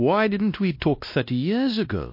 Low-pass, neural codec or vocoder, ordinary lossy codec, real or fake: 5.4 kHz; codec, 16 kHz, 1 kbps, X-Codec, WavLM features, trained on Multilingual LibriSpeech; MP3, 32 kbps; fake